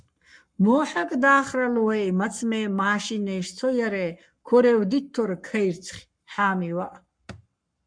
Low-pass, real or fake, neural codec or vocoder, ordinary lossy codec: 9.9 kHz; fake; codec, 44.1 kHz, 7.8 kbps, Pupu-Codec; MP3, 96 kbps